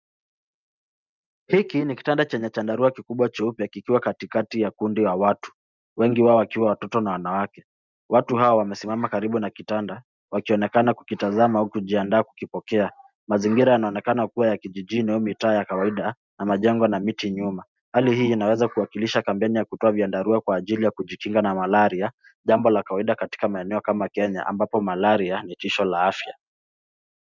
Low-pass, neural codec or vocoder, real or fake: 7.2 kHz; none; real